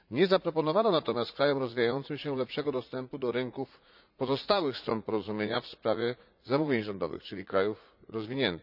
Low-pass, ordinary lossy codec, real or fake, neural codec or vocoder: 5.4 kHz; none; fake; vocoder, 44.1 kHz, 80 mel bands, Vocos